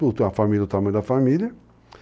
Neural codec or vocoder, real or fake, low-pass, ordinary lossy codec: none; real; none; none